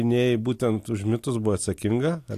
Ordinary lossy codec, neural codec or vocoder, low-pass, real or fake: AAC, 64 kbps; none; 14.4 kHz; real